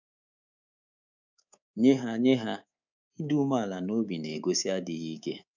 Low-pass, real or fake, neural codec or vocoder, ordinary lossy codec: 7.2 kHz; fake; codec, 24 kHz, 3.1 kbps, DualCodec; none